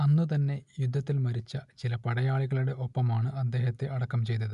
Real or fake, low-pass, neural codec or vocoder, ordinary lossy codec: real; 10.8 kHz; none; none